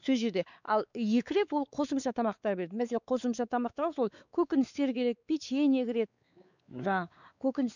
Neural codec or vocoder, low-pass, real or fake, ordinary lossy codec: codec, 16 kHz, 4 kbps, X-Codec, WavLM features, trained on Multilingual LibriSpeech; 7.2 kHz; fake; none